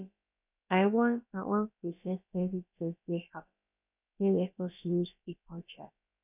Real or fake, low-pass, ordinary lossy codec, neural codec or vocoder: fake; 3.6 kHz; none; codec, 16 kHz, about 1 kbps, DyCAST, with the encoder's durations